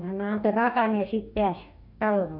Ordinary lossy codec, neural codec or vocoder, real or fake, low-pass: MP3, 48 kbps; codec, 44.1 kHz, 2.6 kbps, DAC; fake; 5.4 kHz